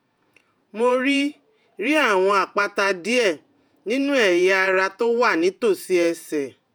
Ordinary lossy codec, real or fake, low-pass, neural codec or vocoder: none; fake; none; vocoder, 48 kHz, 128 mel bands, Vocos